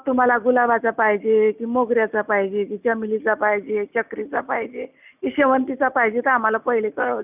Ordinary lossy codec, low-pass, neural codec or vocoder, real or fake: none; 3.6 kHz; none; real